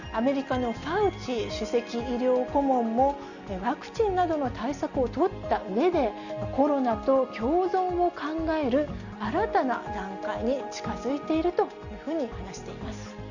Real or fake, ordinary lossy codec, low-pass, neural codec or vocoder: real; none; 7.2 kHz; none